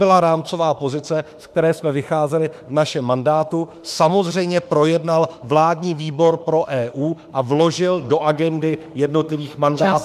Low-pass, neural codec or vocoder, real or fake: 14.4 kHz; autoencoder, 48 kHz, 32 numbers a frame, DAC-VAE, trained on Japanese speech; fake